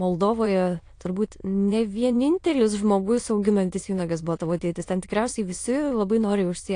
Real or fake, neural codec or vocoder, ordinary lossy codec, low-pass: fake; autoencoder, 22.05 kHz, a latent of 192 numbers a frame, VITS, trained on many speakers; AAC, 48 kbps; 9.9 kHz